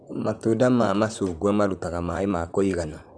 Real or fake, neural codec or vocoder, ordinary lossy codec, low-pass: fake; vocoder, 44.1 kHz, 128 mel bands, Pupu-Vocoder; Opus, 64 kbps; 9.9 kHz